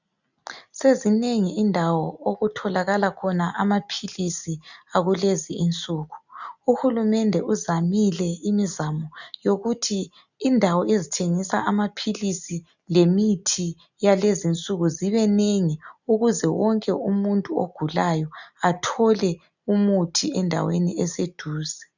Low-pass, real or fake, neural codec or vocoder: 7.2 kHz; real; none